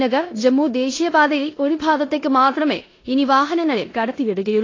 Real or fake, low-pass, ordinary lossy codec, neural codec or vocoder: fake; 7.2 kHz; AAC, 32 kbps; codec, 16 kHz in and 24 kHz out, 0.9 kbps, LongCat-Audio-Codec, four codebook decoder